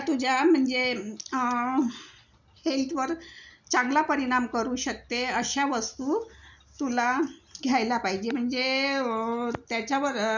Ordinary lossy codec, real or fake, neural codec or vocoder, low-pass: none; real; none; 7.2 kHz